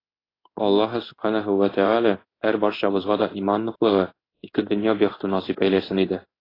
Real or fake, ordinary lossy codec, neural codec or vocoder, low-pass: fake; AAC, 24 kbps; codec, 16 kHz in and 24 kHz out, 1 kbps, XY-Tokenizer; 5.4 kHz